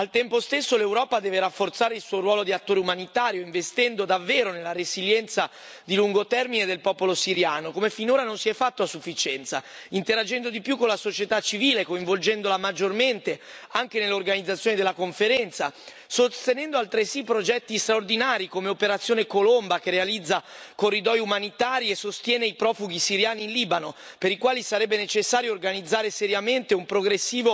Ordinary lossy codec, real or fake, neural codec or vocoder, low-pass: none; real; none; none